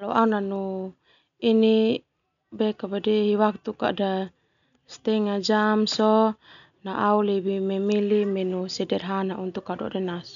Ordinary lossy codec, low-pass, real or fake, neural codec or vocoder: none; 7.2 kHz; real; none